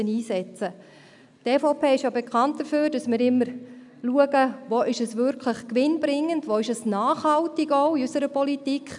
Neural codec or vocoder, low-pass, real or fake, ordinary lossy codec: none; 10.8 kHz; real; none